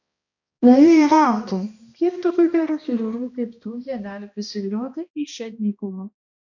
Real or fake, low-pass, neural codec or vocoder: fake; 7.2 kHz; codec, 16 kHz, 1 kbps, X-Codec, HuBERT features, trained on balanced general audio